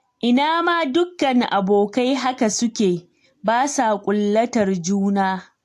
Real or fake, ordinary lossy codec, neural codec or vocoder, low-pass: real; AAC, 64 kbps; none; 14.4 kHz